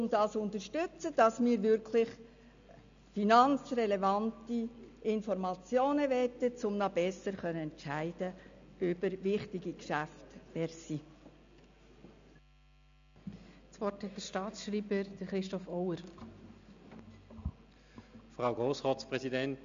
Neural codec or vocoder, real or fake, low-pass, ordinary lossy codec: none; real; 7.2 kHz; MP3, 64 kbps